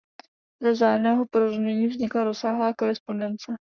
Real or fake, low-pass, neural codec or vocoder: fake; 7.2 kHz; codec, 44.1 kHz, 3.4 kbps, Pupu-Codec